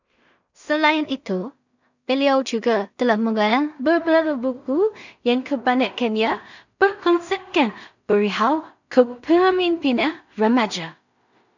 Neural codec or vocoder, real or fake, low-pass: codec, 16 kHz in and 24 kHz out, 0.4 kbps, LongCat-Audio-Codec, two codebook decoder; fake; 7.2 kHz